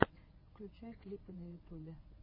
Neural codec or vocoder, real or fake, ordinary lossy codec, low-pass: vocoder, 22.05 kHz, 80 mel bands, WaveNeXt; fake; MP3, 24 kbps; 5.4 kHz